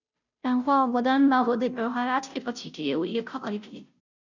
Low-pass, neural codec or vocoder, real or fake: 7.2 kHz; codec, 16 kHz, 0.5 kbps, FunCodec, trained on Chinese and English, 25 frames a second; fake